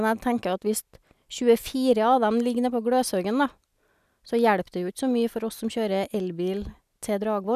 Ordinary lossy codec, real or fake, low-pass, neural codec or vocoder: none; real; 14.4 kHz; none